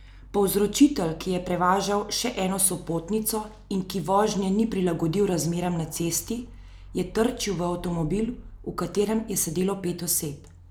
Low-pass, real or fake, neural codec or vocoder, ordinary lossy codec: none; real; none; none